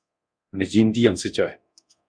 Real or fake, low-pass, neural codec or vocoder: fake; 9.9 kHz; codec, 24 kHz, 0.9 kbps, DualCodec